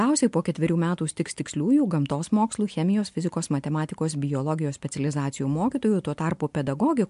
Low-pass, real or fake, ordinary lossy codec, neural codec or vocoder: 10.8 kHz; real; MP3, 64 kbps; none